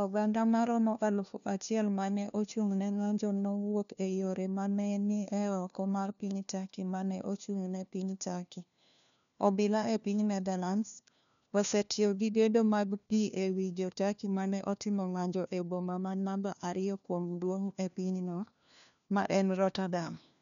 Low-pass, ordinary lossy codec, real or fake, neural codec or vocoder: 7.2 kHz; none; fake; codec, 16 kHz, 1 kbps, FunCodec, trained on LibriTTS, 50 frames a second